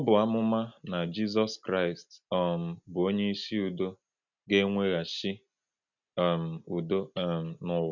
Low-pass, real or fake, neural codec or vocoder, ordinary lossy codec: 7.2 kHz; real; none; none